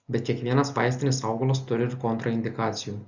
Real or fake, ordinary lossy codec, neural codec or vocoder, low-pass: real; Opus, 64 kbps; none; 7.2 kHz